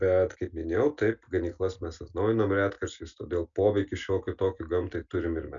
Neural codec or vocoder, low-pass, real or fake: none; 7.2 kHz; real